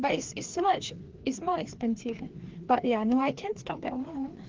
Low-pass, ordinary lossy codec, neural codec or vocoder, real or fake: 7.2 kHz; Opus, 16 kbps; codec, 16 kHz, 2 kbps, FreqCodec, larger model; fake